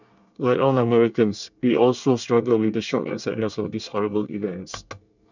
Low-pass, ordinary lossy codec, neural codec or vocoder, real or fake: 7.2 kHz; none; codec, 24 kHz, 1 kbps, SNAC; fake